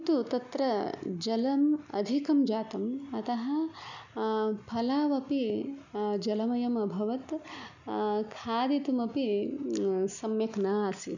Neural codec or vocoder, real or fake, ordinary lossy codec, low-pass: none; real; none; 7.2 kHz